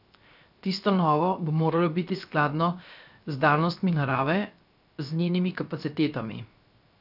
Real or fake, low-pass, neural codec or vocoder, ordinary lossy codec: fake; 5.4 kHz; codec, 16 kHz, 0.7 kbps, FocalCodec; none